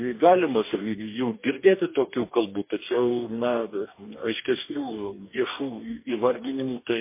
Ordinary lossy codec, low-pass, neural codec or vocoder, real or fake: MP3, 24 kbps; 3.6 kHz; codec, 44.1 kHz, 2.6 kbps, DAC; fake